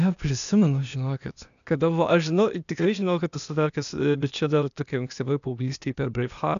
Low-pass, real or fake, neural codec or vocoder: 7.2 kHz; fake; codec, 16 kHz, 0.8 kbps, ZipCodec